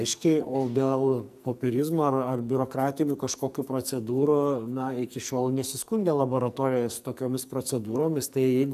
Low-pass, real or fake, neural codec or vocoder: 14.4 kHz; fake; codec, 44.1 kHz, 2.6 kbps, SNAC